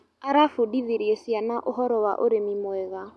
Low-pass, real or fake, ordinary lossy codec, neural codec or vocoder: none; real; none; none